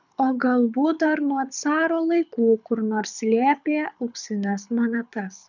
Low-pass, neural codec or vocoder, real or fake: 7.2 kHz; codec, 24 kHz, 6 kbps, HILCodec; fake